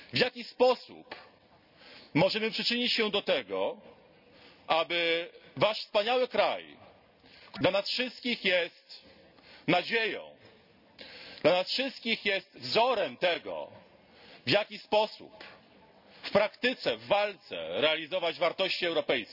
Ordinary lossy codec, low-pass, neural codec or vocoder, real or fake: none; 5.4 kHz; none; real